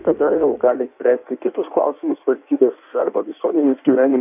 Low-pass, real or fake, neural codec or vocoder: 3.6 kHz; fake; codec, 16 kHz in and 24 kHz out, 1.1 kbps, FireRedTTS-2 codec